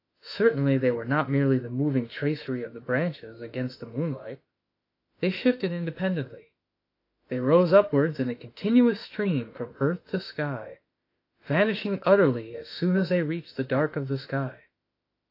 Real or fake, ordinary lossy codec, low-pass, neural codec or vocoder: fake; AAC, 32 kbps; 5.4 kHz; autoencoder, 48 kHz, 32 numbers a frame, DAC-VAE, trained on Japanese speech